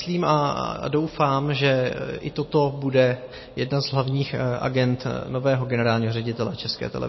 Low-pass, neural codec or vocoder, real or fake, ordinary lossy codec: 7.2 kHz; none; real; MP3, 24 kbps